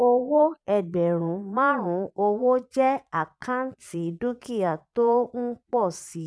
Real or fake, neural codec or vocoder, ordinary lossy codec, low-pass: fake; vocoder, 22.05 kHz, 80 mel bands, Vocos; none; none